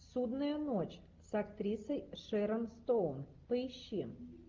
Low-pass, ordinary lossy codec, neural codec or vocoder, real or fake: 7.2 kHz; Opus, 24 kbps; none; real